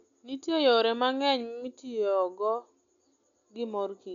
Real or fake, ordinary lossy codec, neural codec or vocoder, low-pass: real; none; none; 7.2 kHz